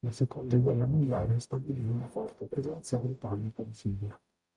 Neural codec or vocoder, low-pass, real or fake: codec, 44.1 kHz, 0.9 kbps, DAC; 10.8 kHz; fake